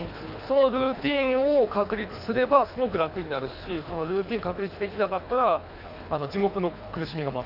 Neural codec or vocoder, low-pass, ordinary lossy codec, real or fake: codec, 24 kHz, 3 kbps, HILCodec; 5.4 kHz; AAC, 48 kbps; fake